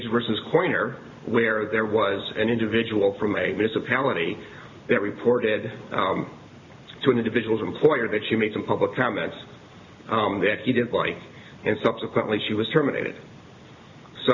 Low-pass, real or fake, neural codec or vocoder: 7.2 kHz; real; none